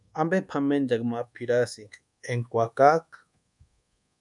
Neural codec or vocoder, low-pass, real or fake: codec, 24 kHz, 1.2 kbps, DualCodec; 10.8 kHz; fake